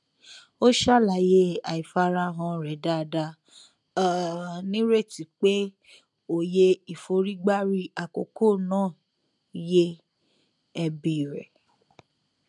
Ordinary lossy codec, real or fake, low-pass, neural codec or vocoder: none; real; 10.8 kHz; none